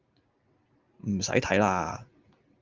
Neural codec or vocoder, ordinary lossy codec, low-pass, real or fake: none; Opus, 32 kbps; 7.2 kHz; real